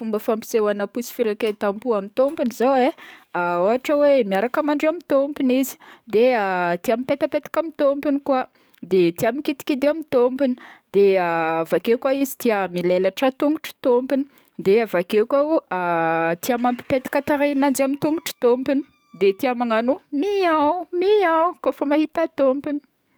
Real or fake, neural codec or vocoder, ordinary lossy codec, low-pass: fake; codec, 44.1 kHz, 7.8 kbps, DAC; none; none